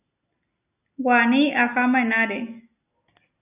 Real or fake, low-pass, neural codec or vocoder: real; 3.6 kHz; none